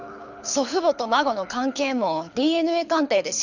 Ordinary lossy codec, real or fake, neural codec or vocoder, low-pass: none; fake; codec, 24 kHz, 6 kbps, HILCodec; 7.2 kHz